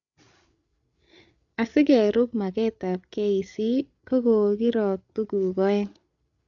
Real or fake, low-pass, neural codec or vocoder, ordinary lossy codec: fake; 7.2 kHz; codec, 16 kHz, 8 kbps, FreqCodec, larger model; none